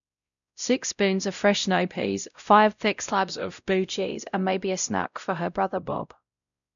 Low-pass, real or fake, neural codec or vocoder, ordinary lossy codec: 7.2 kHz; fake; codec, 16 kHz, 0.5 kbps, X-Codec, WavLM features, trained on Multilingual LibriSpeech; none